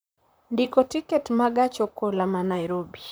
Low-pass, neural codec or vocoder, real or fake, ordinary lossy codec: none; vocoder, 44.1 kHz, 128 mel bands, Pupu-Vocoder; fake; none